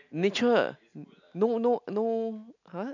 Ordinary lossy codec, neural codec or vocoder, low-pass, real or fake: none; none; 7.2 kHz; real